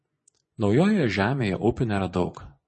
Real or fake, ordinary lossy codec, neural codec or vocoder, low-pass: fake; MP3, 32 kbps; vocoder, 24 kHz, 100 mel bands, Vocos; 10.8 kHz